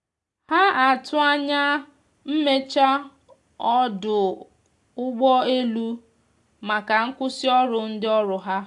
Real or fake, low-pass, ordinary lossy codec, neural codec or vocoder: real; 10.8 kHz; AAC, 64 kbps; none